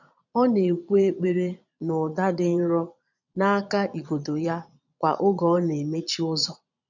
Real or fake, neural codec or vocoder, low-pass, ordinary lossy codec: fake; vocoder, 22.05 kHz, 80 mel bands, Vocos; 7.2 kHz; none